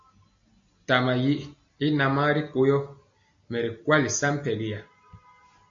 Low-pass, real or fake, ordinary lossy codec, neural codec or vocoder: 7.2 kHz; real; AAC, 64 kbps; none